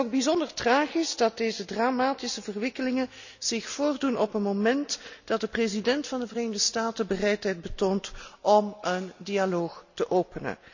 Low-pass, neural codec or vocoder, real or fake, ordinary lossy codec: 7.2 kHz; none; real; none